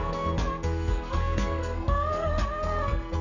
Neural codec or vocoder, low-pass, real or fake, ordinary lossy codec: codec, 44.1 kHz, 2.6 kbps, SNAC; 7.2 kHz; fake; none